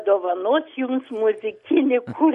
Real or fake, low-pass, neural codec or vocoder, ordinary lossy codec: real; 14.4 kHz; none; MP3, 48 kbps